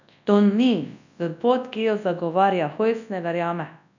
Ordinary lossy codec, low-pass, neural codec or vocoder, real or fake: none; 7.2 kHz; codec, 24 kHz, 0.9 kbps, WavTokenizer, large speech release; fake